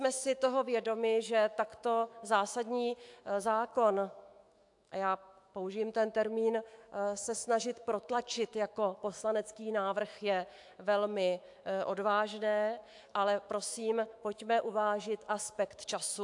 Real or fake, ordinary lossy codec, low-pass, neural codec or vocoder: fake; AAC, 64 kbps; 10.8 kHz; autoencoder, 48 kHz, 128 numbers a frame, DAC-VAE, trained on Japanese speech